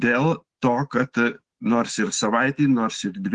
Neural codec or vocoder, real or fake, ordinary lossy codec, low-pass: autoencoder, 48 kHz, 128 numbers a frame, DAC-VAE, trained on Japanese speech; fake; Opus, 16 kbps; 10.8 kHz